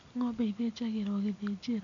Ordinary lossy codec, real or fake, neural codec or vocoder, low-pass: AAC, 64 kbps; real; none; 7.2 kHz